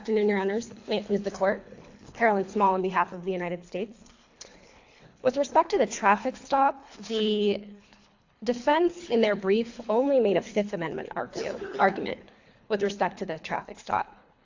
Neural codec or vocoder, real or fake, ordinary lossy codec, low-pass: codec, 24 kHz, 3 kbps, HILCodec; fake; AAC, 48 kbps; 7.2 kHz